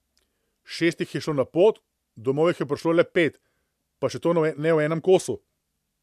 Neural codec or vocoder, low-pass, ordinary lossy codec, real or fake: none; 14.4 kHz; MP3, 96 kbps; real